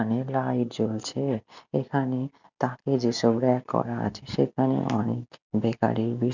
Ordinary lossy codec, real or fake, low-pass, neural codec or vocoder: none; real; 7.2 kHz; none